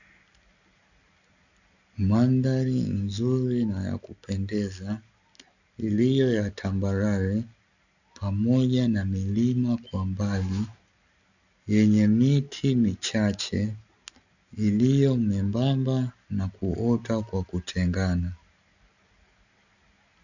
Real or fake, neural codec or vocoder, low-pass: real; none; 7.2 kHz